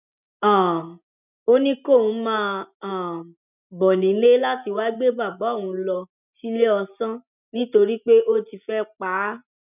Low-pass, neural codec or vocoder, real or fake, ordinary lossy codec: 3.6 kHz; none; real; none